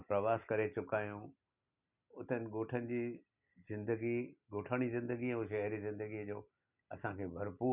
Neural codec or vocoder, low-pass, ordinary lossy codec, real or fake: none; 3.6 kHz; none; real